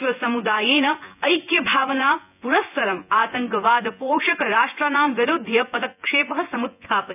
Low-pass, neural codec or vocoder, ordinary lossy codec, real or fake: 3.6 kHz; vocoder, 24 kHz, 100 mel bands, Vocos; none; fake